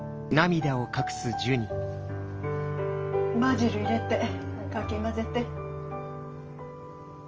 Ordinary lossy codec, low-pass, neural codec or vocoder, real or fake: Opus, 24 kbps; 7.2 kHz; none; real